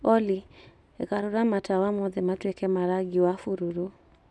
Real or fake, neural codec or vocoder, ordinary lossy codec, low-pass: real; none; none; none